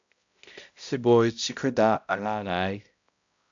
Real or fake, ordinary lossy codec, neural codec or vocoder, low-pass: fake; AAC, 64 kbps; codec, 16 kHz, 0.5 kbps, X-Codec, HuBERT features, trained on balanced general audio; 7.2 kHz